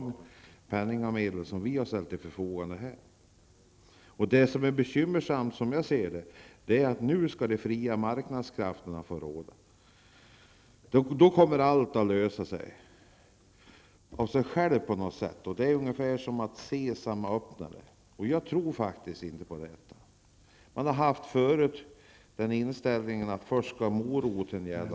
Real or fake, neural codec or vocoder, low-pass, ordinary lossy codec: real; none; none; none